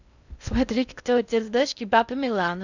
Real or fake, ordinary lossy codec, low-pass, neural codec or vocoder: fake; none; 7.2 kHz; codec, 16 kHz in and 24 kHz out, 0.6 kbps, FocalCodec, streaming, 2048 codes